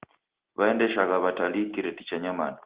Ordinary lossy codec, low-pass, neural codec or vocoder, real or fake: Opus, 16 kbps; 3.6 kHz; none; real